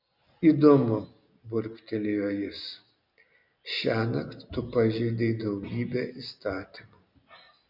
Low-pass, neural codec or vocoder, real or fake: 5.4 kHz; none; real